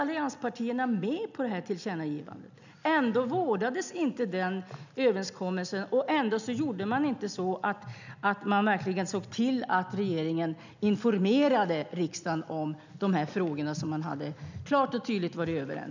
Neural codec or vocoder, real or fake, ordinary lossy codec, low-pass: none; real; none; 7.2 kHz